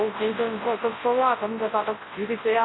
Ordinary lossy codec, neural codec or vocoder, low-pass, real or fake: AAC, 16 kbps; codec, 24 kHz, 0.9 kbps, WavTokenizer, large speech release; 7.2 kHz; fake